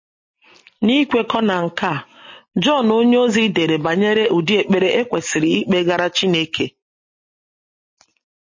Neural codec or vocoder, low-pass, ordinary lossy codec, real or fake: none; 7.2 kHz; MP3, 32 kbps; real